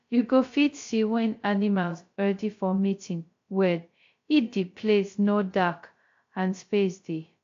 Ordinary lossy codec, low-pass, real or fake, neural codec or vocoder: AAC, 48 kbps; 7.2 kHz; fake; codec, 16 kHz, 0.2 kbps, FocalCodec